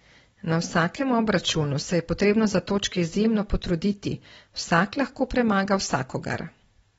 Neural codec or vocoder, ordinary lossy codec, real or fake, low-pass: none; AAC, 24 kbps; real; 19.8 kHz